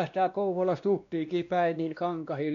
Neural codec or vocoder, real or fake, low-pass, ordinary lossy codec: codec, 16 kHz, 1 kbps, X-Codec, WavLM features, trained on Multilingual LibriSpeech; fake; 7.2 kHz; none